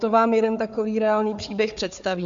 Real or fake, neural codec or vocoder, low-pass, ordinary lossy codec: fake; codec, 16 kHz, 4 kbps, FunCodec, trained on Chinese and English, 50 frames a second; 7.2 kHz; MP3, 64 kbps